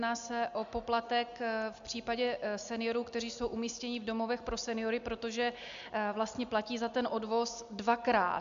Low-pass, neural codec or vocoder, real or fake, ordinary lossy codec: 7.2 kHz; none; real; AAC, 96 kbps